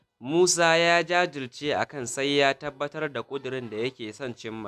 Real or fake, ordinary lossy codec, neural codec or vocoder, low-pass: real; none; none; 14.4 kHz